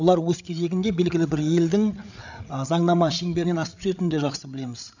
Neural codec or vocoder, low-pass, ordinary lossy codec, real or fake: codec, 16 kHz, 8 kbps, FreqCodec, larger model; 7.2 kHz; none; fake